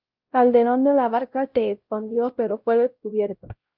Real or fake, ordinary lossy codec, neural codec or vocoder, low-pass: fake; Opus, 24 kbps; codec, 16 kHz, 0.5 kbps, X-Codec, WavLM features, trained on Multilingual LibriSpeech; 5.4 kHz